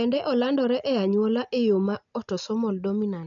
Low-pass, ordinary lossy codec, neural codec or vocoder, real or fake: 7.2 kHz; none; none; real